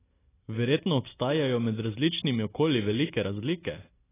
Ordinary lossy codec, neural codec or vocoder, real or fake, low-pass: AAC, 16 kbps; none; real; 3.6 kHz